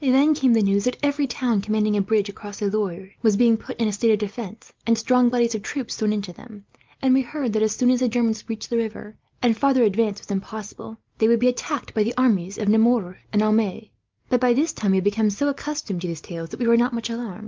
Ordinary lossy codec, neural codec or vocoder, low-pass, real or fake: Opus, 32 kbps; none; 7.2 kHz; real